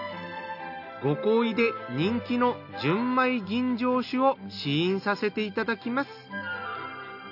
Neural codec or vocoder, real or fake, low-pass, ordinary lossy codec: none; real; 5.4 kHz; none